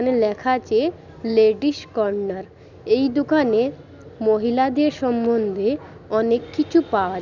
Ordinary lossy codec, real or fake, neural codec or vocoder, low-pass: none; real; none; 7.2 kHz